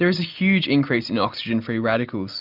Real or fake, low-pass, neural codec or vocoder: real; 5.4 kHz; none